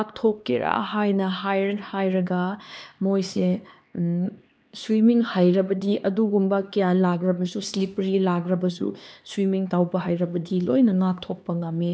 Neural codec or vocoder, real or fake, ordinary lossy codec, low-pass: codec, 16 kHz, 2 kbps, X-Codec, HuBERT features, trained on LibriSpeech; fake; none; none